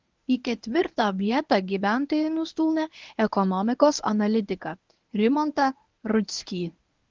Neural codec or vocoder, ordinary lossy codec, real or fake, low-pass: codec, 24 kHz, 0.9 kbps, WavTokenizer, medium speech release version 1; Opus, 32 kbps; fake; 7.2 kHz